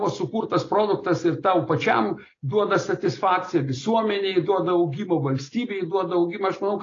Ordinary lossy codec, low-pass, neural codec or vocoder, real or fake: AAC, 32 kbps; 7.2 kHz; none; real